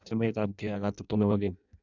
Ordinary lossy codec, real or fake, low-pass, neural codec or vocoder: none; fake; 7.2 kHz; codec, 16 kHz in and 24 kHz out, 0.6 kbps, FireRedTTS-2 codec